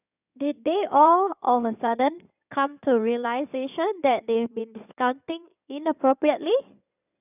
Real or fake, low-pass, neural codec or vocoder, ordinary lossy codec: fake; 3.6 kHz; codec, 16 kHz in and 24 kHz out, 2.2 kbps, FireRedTTS-2 codec; none